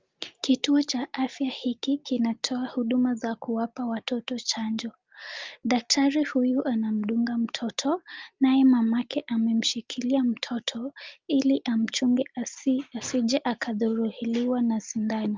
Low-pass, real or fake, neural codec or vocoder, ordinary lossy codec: 7.2 kHz; real; none; Opus, 32 kbps